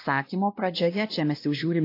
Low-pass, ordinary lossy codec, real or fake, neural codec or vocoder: 5.4 kHz; AAC, 32 kbps; fake; codec, 16 kHz, 1 kbps, X-Codec, WavLM features, trained on Multilingual LibriSpeech